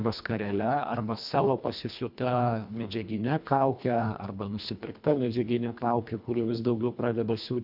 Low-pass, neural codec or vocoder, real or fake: 5.4 kHz; codec, 24 kHz, 1.5 kbps, HILCodec; fake